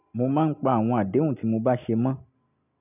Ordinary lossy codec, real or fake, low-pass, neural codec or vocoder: none; real; 3.6 kHz; none